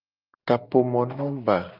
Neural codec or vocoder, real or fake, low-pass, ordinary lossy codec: none; real; 5.4 kHz; Opus, 24 kbps